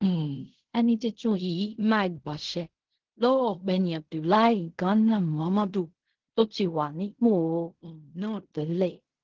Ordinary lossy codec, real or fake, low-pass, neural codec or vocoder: Opus, 16 kbps; fake; 7.2 kHz; codec, 16 kHz in and 24 kHz out, 0.4 kbps, LongCat-Audio-Codec, fine tuned four codebook decoder